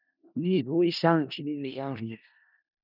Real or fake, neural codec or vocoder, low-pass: fake; codec, 16 kHz in and 24 kHz out, 0.4 kbps, LongCat-Audio-Codec, four codebook decoder; 5.4 kHz